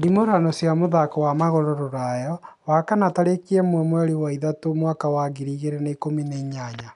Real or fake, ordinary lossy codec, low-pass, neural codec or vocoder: real; none; 10.8 kHz; none